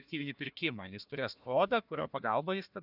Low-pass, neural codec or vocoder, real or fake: 5.4 kHz; codec, 16 kHz, 1 kbps, FunCodec, trained on Chinese and English, 50 frames a second; fake